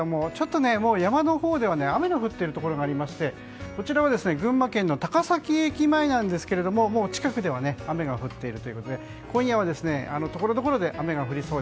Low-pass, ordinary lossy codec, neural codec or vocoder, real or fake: none; none; none; real